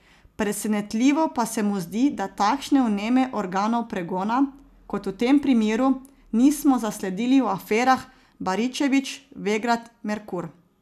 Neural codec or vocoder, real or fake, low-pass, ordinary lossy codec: none; real; 14.4 kHz; none